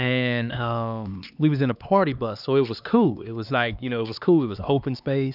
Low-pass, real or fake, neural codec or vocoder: 5.4 kHz; fake; codec, 16 kHz, 2 kbps, X-Codec, HuBERT features, trained on LibriSpeech